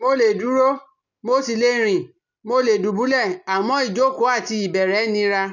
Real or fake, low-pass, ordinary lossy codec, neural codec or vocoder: real; 7.2 kHz; none; none